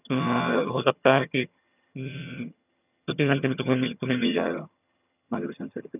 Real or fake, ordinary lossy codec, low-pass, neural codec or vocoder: fake; none; 3.6 kHz; vocoder, 22.05 kHz, 80 mel bands, HiFi-GAN